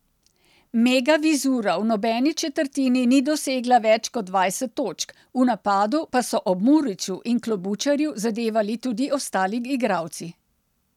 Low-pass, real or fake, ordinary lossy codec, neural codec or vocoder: 19.8 kHz; real; none; none